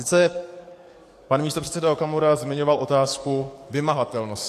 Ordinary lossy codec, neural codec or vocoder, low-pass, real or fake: AAC, 64 kbps; codec, 44.1 kHz, 7.8 kbps, DAC; 14.4 kHz; fake